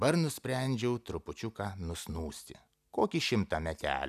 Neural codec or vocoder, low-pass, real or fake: none; 14.4 kHz; real